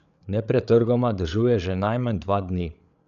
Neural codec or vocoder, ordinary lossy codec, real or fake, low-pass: codec, 16 kHz, 8 kbps, FreqCodec, larger model; none; fake; 7.2 kHz